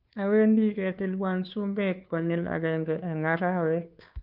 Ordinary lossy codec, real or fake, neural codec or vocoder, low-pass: none; fake; codec, 16 kHz, 2 kbps, FunCodec, trained on Chinese and English, 25 frames a second; 5.4 kHz